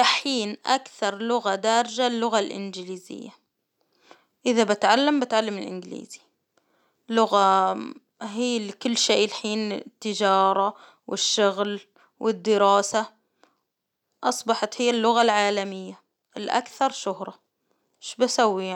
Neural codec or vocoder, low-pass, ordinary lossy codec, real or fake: none; 19.8 kHz; none; real